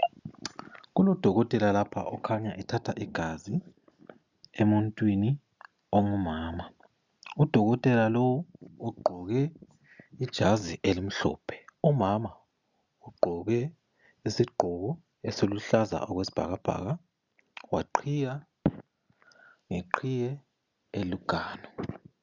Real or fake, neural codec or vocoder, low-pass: real; none; 7.2 kHz